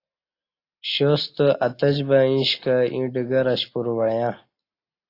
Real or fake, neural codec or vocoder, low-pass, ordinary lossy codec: real; none; 5.4 kHz; AAC, 32 kbps